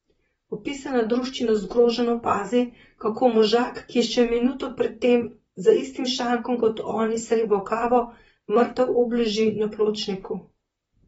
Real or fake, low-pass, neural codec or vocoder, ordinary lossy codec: fake; 19.8 kHz; vocoder, 44.1 kHz, 128 mel bands, Pupu-Vocoder; AAC, 24 kbps